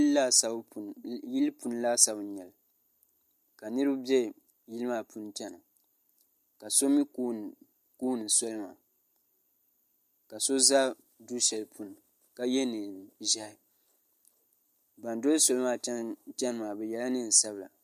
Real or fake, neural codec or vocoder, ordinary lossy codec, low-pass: real; none; MP3, 64 kbps; 14.4 kHz